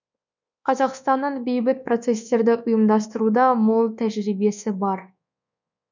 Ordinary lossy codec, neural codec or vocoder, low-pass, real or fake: none; codec, 24 kHz, 1.2 kbps, DualCodec; 7.2 kHz; fake